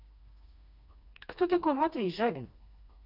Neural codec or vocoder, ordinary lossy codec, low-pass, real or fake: codec, 16 kHz, 2 kbps, FreqCodec, smaller model; none; 5.4 kHz; fake